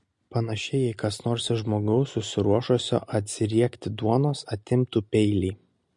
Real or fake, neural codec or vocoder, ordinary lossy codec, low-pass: real; none; MP3, 48 kbps; 10.8 kHz